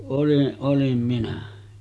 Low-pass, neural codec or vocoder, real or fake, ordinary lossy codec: none; none; real; none